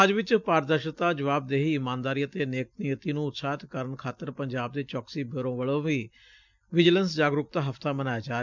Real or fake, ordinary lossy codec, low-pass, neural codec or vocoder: real; none; 7.2 kHz; none